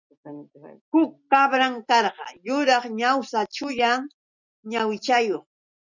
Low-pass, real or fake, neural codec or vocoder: 7.2 kHz; real; none